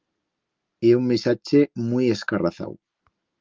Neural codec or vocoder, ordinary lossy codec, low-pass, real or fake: none; Opus, 32 kbps; 7.2 kHz; real